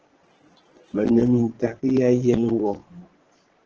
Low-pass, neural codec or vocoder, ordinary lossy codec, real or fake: 7.2 kHz; vocoder, 22.05 kHz, 80 mel bands, Vocos; Opus, 16 kbps; fake